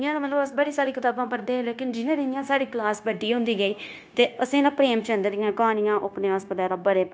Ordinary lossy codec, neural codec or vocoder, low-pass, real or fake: none; codec, 16 kHz, 0.9 kbps, LongCat-Audio-Codec; none; fake